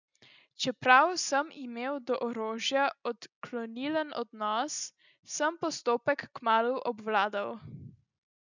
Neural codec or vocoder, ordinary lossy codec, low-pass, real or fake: none; none; 7.2 kHz; real